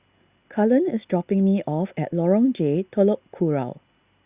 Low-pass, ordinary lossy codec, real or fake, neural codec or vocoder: 3.6 kHz; Opus, 64 kbps; real; none